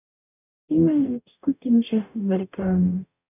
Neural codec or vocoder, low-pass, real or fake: codec, 44.1 kHz, 0.9 kbps, DAC; 3.6 kHz; fake